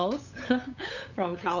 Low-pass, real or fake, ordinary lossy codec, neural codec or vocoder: 7.2 kHz; fake; none; codec, 16 kHz, 8 kbps, FunCodec, trained on LibriTTS, 25 frames a second